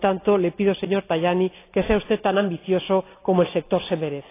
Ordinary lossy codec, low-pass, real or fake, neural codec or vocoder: AAC, 24 kbps; 3.6 kHz; real; none